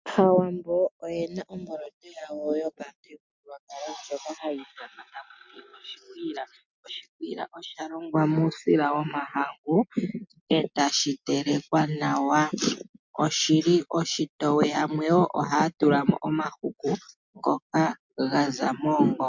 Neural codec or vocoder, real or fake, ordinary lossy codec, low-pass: none; real; MP3, 64 kbps; 7.2 kHz